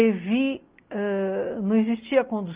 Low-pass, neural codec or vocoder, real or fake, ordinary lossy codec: 3.6 kHz; none; real; Opus, 32 kbps